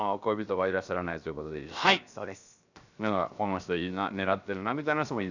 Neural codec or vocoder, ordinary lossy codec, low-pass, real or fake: codec, 16 kHz, 0.7 kbps, FocalCodec; none; 7.2 kHz; fake